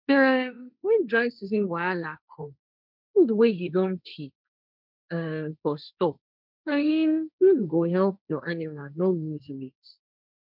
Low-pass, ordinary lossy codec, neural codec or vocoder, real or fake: 5.4 kHz; none; codec, 16 kHz, 1.1 kbps, Voila-Tokenizer; fake